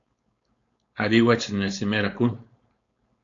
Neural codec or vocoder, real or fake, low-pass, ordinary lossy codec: codec, 16 kHz, 4.8 kbps, FACodec; fake; 7.2 kHz; AAC, 48 kbps